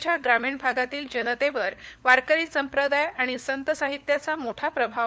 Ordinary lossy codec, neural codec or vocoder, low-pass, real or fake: none; codec, 16 kHz, 4 kbps, FunCodec, trained on LibriTTS, 50 frames a second; none; fake